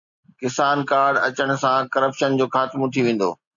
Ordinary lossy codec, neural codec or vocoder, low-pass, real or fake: MP3, 64 kbps; none; 7.2 kHz; real